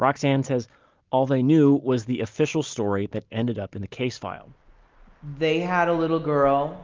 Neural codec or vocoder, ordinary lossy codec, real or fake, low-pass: none; Opus, 32 kbps; real; 7.2 kHz